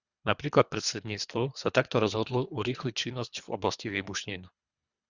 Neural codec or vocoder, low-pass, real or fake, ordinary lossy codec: codec, 24 kHz, 3 kbps, HILCodec; 7.2 kHz; fake; Opus, 64 kbps